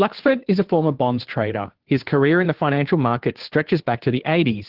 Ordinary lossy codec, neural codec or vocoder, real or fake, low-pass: Opus, 16 kbps; codec, 16 kHz, 2 kbps, FunCodec, trained on Chinese and English, 25 frames a second; fake; 5.4 kHz